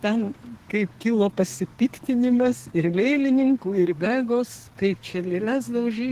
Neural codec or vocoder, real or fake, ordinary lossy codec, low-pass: codec, 32 kHz, 1.9 kbps, SNAC; fake; Opus, 16 kbps; 14.4 kHz